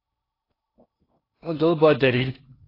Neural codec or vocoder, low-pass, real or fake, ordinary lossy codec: codec, 16 kHz in and 24 kHz out, 0.8 kbps, FocalCodec, streaming, 65536 codes; 5.4 kHz; fake; AAC, 24 kbps